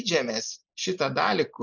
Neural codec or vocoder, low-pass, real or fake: none; 7.2 kHz; real